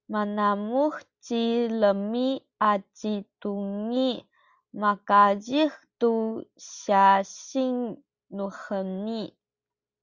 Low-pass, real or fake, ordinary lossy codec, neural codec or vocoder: 7.2 kHz; real; Opus, 64 kbps; none